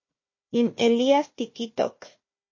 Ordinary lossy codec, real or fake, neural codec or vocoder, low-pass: MP3, 32 kbps; fake; codec, 16 kHz, 1 kbps, FunCodec, trained on Chinese and English, 50 frames a second; 7.2 kHz